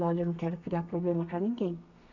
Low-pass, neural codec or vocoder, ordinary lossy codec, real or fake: 7.2 kHz; codec, 32 kHz, 1.9 kbps, SNAC; none; fake